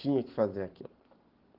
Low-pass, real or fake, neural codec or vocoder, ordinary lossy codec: 5.4 kHz; real; none; Opus, 16 kbps